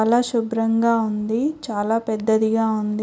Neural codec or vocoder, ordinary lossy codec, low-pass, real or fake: none; none; none; real